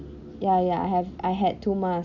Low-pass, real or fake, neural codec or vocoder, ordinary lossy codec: 7.2 kHz; real; none; none